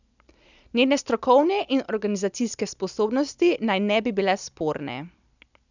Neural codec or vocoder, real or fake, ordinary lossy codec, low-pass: none; real; none; 7.2 kHz